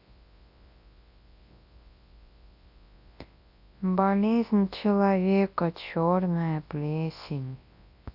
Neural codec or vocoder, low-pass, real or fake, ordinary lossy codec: codec, 24 kHz, 0.9 kbps, WavTokenizer, large speech release; 5.4 kHz; fake; AAC, 48 kbps